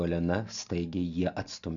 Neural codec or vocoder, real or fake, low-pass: none; real; 7.2 kHz